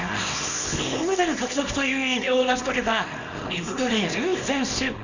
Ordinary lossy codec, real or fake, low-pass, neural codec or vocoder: none; fake; 7.2 kHz; codec, 24 kHz, 0.9 kbps, WavTokenizer, small release